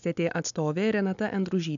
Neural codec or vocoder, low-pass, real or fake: none; 7.2 kHz; real